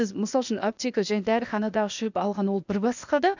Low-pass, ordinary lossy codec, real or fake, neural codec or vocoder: 7.2 kHz; none; fake; codec, 16 kHz, 0.8 kbps, ZipCodec